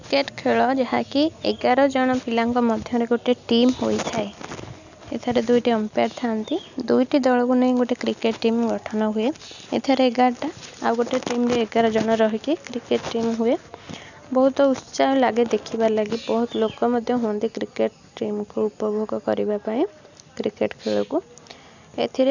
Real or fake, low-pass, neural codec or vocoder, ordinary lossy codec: real; 7.2 kHz; none; none